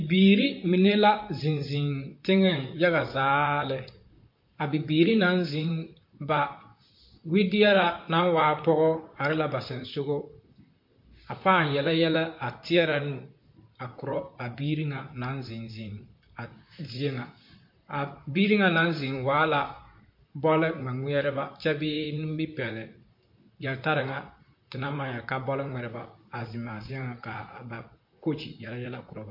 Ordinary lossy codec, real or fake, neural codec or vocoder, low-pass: MP3, 32 kbps; fake; vocoder, 44.1 kHz, 128 mel bands, Pupu-Vocoder; 5.4 kHz